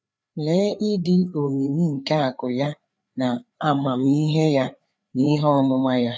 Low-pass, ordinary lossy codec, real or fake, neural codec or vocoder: none; none; fake; codec, 16 kHz, 4 kbps, FreqCodec, larger model